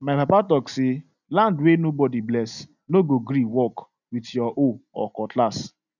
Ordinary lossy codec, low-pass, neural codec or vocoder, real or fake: none; 7.2 kHz; none; real